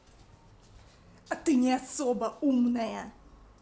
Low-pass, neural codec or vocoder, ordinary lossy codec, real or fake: none; none; none; real